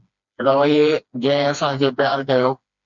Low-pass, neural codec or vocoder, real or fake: 7.2 kHz; codec, 16 kHz, 2 kbps, FreqCodec, smaller model; fake